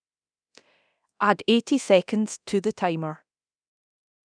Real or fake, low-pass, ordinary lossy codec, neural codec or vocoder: fake; 9.9 kHz; none; codec, 24 kHz, 0.9 kbps, DualCodec